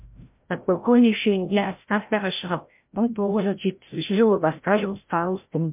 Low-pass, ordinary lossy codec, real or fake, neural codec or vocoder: 3.6 kHz; MP3, 32 kbps; fake; codec, 16 kHz, 0.5 kbps, FreqCodec, larger model